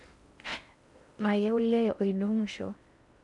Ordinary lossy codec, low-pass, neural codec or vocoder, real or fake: none; 10.8 kHz; codec, 16 kHz in and 24 kHz out, 0.6 kbps, FocalCodec, streaming, 4096 codes; fake